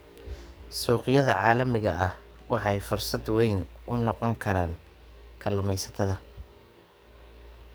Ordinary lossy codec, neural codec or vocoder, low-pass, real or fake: none; codec, 44.1 kHz, 2.6 kbps, SNAC; none; fake